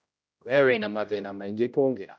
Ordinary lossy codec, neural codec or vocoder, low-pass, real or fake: none; codec, 16 kHz, 0.5 kbps, X-Codec, HuBERT features, trained on general audio; none; fake